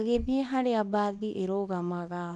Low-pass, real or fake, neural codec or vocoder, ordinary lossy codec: 10.8 kHz; fake; codec, 24 kHz, 0.9 kbps, WavTokenizer, small release; none